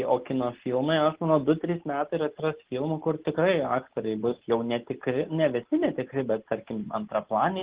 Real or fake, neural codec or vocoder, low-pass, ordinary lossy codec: real; none; 3.6 kHz; Opus, 16 kbps